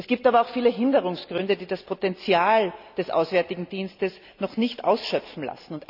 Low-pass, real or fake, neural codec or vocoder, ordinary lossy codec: 5.4 kHz; real; none; none